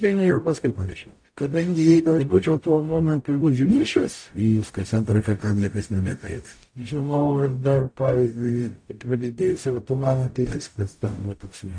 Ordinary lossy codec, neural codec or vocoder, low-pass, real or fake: AAC, 64 kbps; codec, 44.1 kHz, 0.9 kbps, DAC; 9.9 kHz; fake